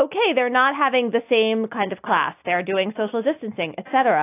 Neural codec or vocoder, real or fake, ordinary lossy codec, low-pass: none; real; AAC, 24 kbps; 3.6 kHz